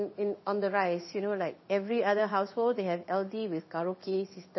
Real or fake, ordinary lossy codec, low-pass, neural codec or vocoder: fake; MP3, 24 kbps; 7.2 kHz; codec, 16 kHz in and 24 kHz out, 1 kbps, XY-Tokenizer